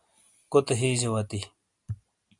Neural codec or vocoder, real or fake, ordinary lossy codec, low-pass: none; real; MP3, 64 kbps; 10.8 kHz